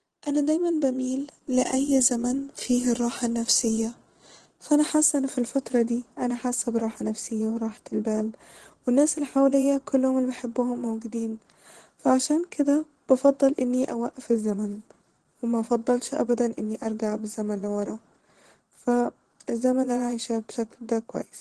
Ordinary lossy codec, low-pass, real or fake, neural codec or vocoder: Opus, 16 kbps; 9.9 kHz; fake; vocoder, 22.05 kHz, 80 mel bands, Vocos